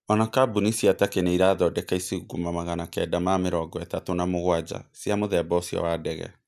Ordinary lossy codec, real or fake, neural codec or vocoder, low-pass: none; real; none; 14.4 kHz